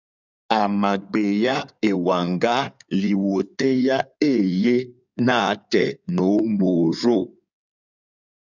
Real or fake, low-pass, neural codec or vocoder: fake; 7.2 kHz; codec, 16 kHz in and 24 kHz out, 2.2 kbps, FireRedTTS-2 codec